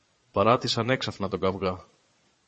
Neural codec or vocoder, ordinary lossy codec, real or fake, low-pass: vocoder, 22.05 kHz, 80 mel bands, Vocos; MP3, 32 kbps; fake; 9.9 kHz